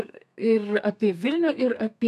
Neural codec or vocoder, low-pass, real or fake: codec, 32 kHz, 1.9 kbps, SNAC; 14.4 kHz; fake